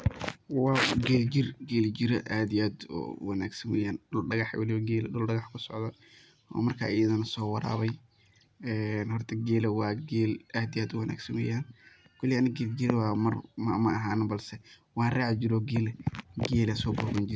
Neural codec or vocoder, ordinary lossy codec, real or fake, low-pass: none; none; real; none